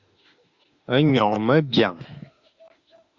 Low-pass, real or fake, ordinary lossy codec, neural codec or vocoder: 7.2 kHz; fake; Opus, 64 kbps; autoencoder, 48 kHz, 32 numbers a frame, DAC-VAE, trained on Japanese speech